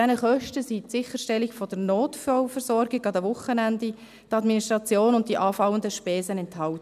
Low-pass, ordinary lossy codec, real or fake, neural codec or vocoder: 14.4 kHz; none; real; none